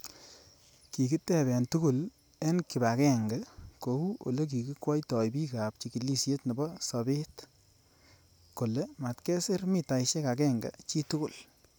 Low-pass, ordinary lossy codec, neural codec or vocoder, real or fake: none; none; none; real